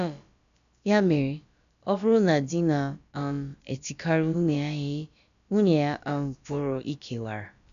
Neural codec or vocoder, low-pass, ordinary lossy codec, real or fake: codec, 16 kHz, about 1 kbps, DyCAST, with the encoder's durations; 7.2 kHz; none; fake